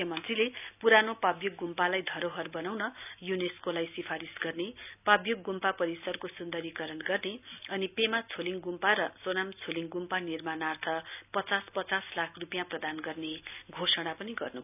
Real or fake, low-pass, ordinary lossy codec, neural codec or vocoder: real; 3.6 kHz; none; none